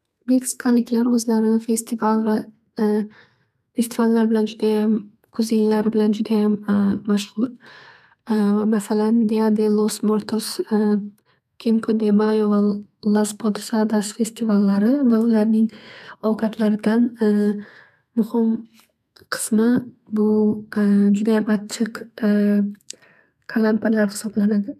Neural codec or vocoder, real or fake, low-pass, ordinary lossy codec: codec, 32 kHz, 1.9 kbps, SNAC; fake; 14.4 kHz; none